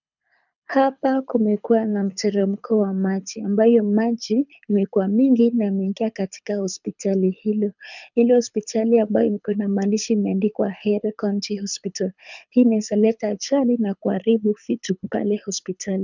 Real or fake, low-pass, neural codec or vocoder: fake; 7.2 kHz; codec, 24 kHz, 6 kbps, HILCodec